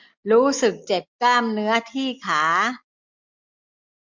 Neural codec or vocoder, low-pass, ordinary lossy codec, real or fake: none; 7.2 kHz; MP3, 48 kbps; real